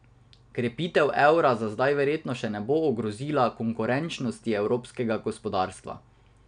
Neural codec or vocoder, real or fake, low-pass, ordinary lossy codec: none; real; 9.9 kHz; none